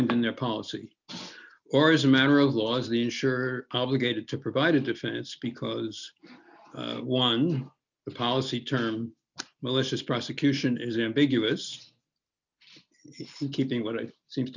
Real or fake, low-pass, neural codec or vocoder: real; 7.2 kHz; none